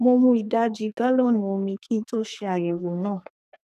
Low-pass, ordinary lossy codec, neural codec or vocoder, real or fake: 14.4 kHz; none; codec, 32 kHz, 1.9 kbps, SNAC; fake